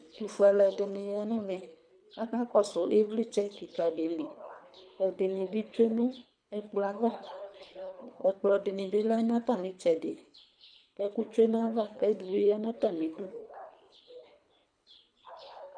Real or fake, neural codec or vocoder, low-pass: fake; codec, 24 kHz, 3 kbps, HILCodec; 9.9 kHz